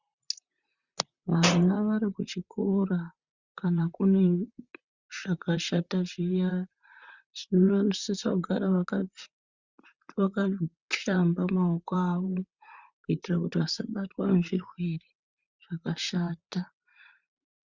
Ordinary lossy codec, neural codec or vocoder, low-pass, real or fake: Opus, 64 kbps; vocoder, 44.1 kHz, 128 mel bands, Pupu-Vocoder; 7.2 kHz; fake